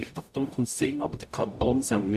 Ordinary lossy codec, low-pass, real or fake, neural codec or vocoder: none; 14.4 kHz; fake; codec, 44.1 kHz, 0.9 kbps, DAC